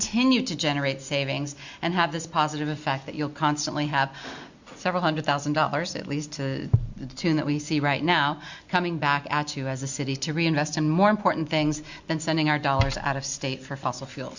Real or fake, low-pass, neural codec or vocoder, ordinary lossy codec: real; 7.2 kHz; none; Opus, 64 kbps